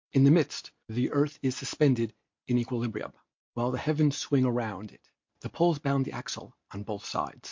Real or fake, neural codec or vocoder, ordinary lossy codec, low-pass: real; none; MP3, 48 kbps; 7.2 kHz